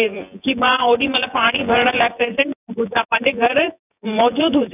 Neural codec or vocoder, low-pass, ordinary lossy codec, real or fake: vocoder, 24 kHz, 100 mel bands, Vocos; 3.6 kHz; none; fake